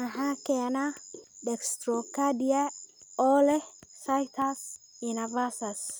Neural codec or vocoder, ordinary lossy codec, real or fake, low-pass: none; none; real; none